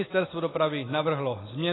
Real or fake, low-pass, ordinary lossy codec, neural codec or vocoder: real; 7.2 kHz; AAC, 16 kbps; none